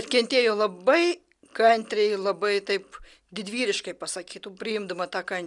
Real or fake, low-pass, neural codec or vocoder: real; 10.8 kHz; none